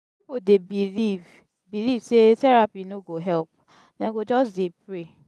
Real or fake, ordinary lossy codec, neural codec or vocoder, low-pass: fake; none; vocoder, 24 kHz, 100 mel bands, Vocos; none